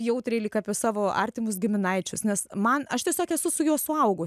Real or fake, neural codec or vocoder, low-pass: real; none; 14.4 kHz